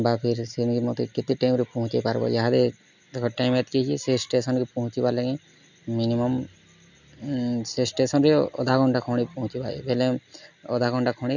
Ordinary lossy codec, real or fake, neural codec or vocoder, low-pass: none; real; none; 7.2 kHz